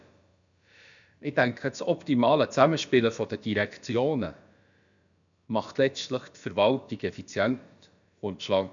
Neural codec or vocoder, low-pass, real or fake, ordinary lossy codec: codec, 16 kHz, about 1 kbps, DyCAST, with the encoder's durations; 7.2 kHz; fake; none